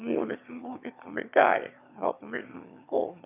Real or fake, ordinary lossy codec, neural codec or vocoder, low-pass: fake; none; autoencoder, 22.05 kHz, a latent of 192 numbers a frame, VITS, trained on one speaker; 3.6 kHz